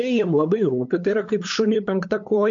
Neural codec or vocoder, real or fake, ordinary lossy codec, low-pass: codec, 16 kHz, 8 kbps, FunCodec, trained on LibriTTS, 25 frames a second; fake; MP3, 48 kbps; 7.2 kHz